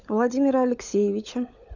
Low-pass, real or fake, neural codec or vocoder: 7.2 kHz; fake; codec, 16 kHz, 16 kbps, FunCodec, trained on LibriTTS, 50 frames a second